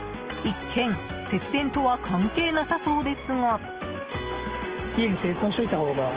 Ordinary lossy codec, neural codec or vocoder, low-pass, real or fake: Opus, 16 kbps; none; 3.6 kHz; real